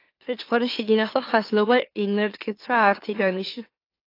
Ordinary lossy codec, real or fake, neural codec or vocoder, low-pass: AAC, 32 kbps; fake; autoencoder, 44.1 kHz, a latent of 192 numbers a frame, MeloTTS; 5.4 kHz